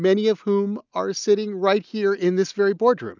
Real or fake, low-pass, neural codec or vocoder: real; 7.2 kHz; none